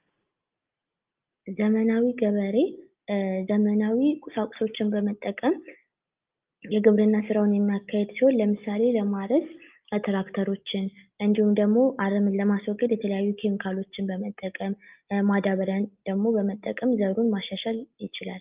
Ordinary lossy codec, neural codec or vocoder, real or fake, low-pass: Opus, 32 kbps; none; real; 3.6 kHz